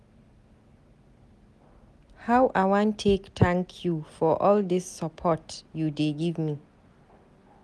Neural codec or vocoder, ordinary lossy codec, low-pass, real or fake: none; none; none; real